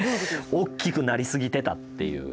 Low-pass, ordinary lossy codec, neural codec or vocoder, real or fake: none; none; none; real